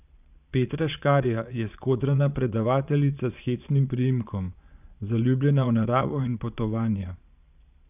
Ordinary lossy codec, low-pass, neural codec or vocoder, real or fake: none; 3.6 kHz; vocoder, 22.05 kHz, 80 mel bands, Vocos; fake